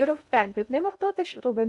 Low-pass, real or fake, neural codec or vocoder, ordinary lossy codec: 10.8 kHz; fake; codec, 16 kHz in and 24 kHz out, 0.6 kbps, FocalCodec, streaming, 2048 codes; AAC, 64 kbps